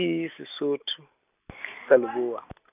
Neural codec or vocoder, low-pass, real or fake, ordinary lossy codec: none; 3.6 kHz; real; none